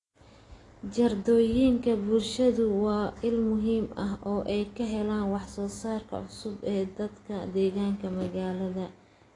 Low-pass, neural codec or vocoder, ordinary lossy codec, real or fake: 10.8 kHz; none; AAC, 32 kbps; real